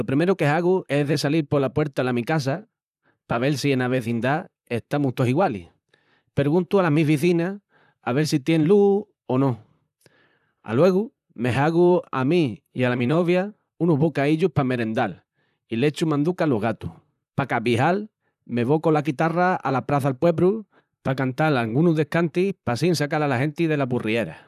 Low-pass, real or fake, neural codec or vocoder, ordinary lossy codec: 14.4 kHz; fake; vocoder, 44.1 kHz, 128 mel bands, Pupu-Vocoder; none